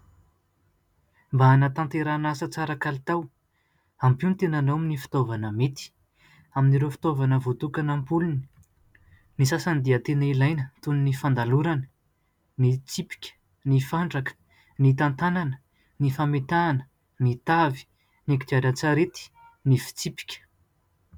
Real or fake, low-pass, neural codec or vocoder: real; 19.8 kHz; none